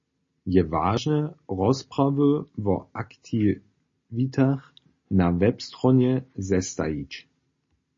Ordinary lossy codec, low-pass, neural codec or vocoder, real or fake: MP3, 32 kbps; 7.2 kHz; none; real